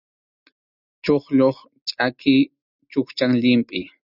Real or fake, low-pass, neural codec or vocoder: real; 5.4 kHz; none